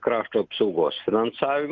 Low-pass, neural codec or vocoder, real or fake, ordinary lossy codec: 7.2 kHz; none; real; Opus, 32 kbps